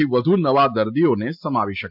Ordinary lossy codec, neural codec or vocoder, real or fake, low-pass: MP3, 48 kbps; none; real; 5.4 kHz